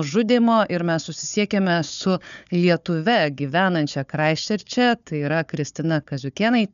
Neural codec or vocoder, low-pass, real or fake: codec, 16 kHz, 4 kbps, FunCodec, trained on Chinese and English, 50 frames a second; 7.2 kHz; fake